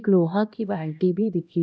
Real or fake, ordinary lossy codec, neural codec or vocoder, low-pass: fake; none; codec, 16 kHz, 1 kbps, X-Codec, HuBERT features, trained on LibriSpeech; none